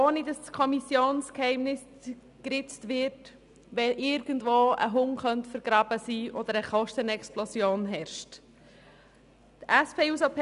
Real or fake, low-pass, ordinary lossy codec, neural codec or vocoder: real; 10.8 kHz; none; none